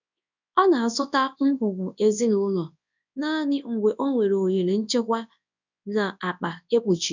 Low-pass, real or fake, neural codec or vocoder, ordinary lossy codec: 7.2 kHz; fake; codec, 24 kHz, 0.9 kbps, WavTokenizer, large speech release; none